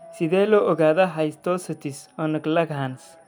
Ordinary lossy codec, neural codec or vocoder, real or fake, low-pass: none; none; real; none